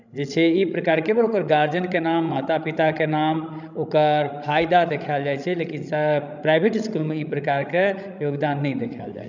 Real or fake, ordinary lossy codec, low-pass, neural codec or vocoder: fake; none; 7.2 kHz; codec, 16 kHz, 16 kbps, FreqCodec, larger model